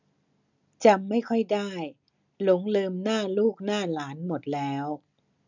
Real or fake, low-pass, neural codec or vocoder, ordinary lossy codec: real; 7.2 kHz; none; none